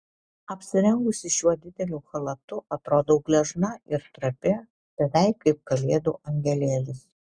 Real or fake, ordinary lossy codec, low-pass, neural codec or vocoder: real; Opus, 64 kbps; 9.9 kHz; none